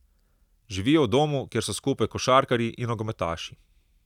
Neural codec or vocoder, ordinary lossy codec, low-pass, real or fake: none; none; 19.8 kHz; real